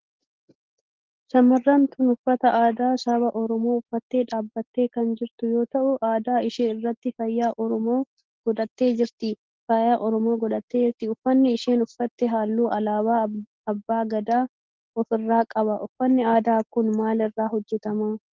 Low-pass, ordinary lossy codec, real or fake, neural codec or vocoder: 7.2 kHz; Opus, 16 kbps; real; none